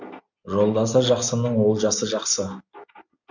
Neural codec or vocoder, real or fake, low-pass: none; real; 7.2 kHz